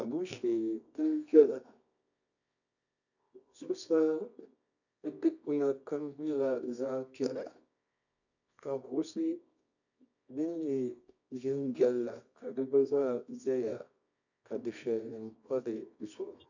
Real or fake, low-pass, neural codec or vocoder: fake; 7.2 kHz; codec, 24 kHz, 0.9 kbps, WavTokenizer, medium music audio release